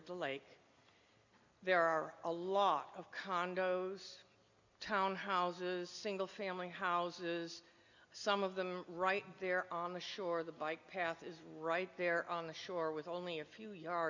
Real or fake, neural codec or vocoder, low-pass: real; none; 7.2 kHz